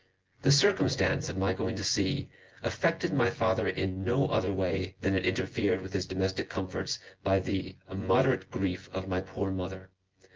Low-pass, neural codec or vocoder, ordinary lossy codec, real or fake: 7.2 kHz; vocoder, 24 kHz, 100 mel bands, Vocos; Opus, 16 kbps; fake